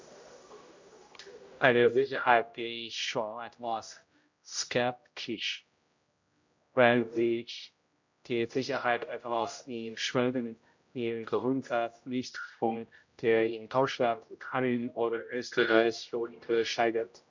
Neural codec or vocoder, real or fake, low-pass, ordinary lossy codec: codec, 16 kHz, 0.5 kbps, X-Codec, HuBERT features, trained on general audio; fake; 7.2 kHz; MP3, 64 kbps